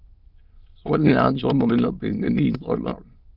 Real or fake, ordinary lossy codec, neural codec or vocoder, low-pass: fake; Opus, 32 kbps; autoencoder, 22.05 kHz, a latent of 192 numbers a frame, VITS, trained on many speakers; 5.4 kHz